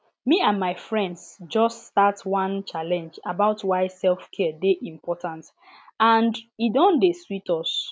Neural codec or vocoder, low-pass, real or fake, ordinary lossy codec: none; none; real; none